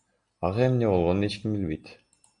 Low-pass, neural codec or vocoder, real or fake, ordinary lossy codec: 9.9 kHz; none; real; MP3, 64 kbps